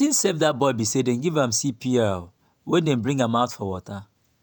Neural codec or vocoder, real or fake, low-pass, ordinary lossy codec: vocoder, 48 kHz, 128 mel bands, Vocos; fake; none; none